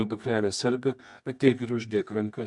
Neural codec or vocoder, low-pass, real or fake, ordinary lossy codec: codec, 24 kHz, 0.9 kbps, WavTokenizer, medium music audio release; 10.8 kHz; fake; MP3, 64 kbps